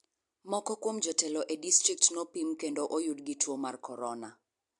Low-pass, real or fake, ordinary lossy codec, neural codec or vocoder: 10.8 kHz; real; AAC, 64 kbps; none